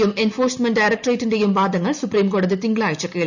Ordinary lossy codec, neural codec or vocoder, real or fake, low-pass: none; none; real; 7.2 kHz